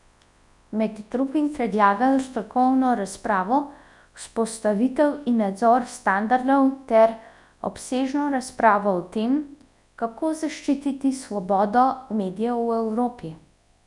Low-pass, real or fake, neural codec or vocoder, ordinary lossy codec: 10.8 kHz; fake; codec, 24 kHz, 0.9 kbps, WavTokenizer, large speech release; none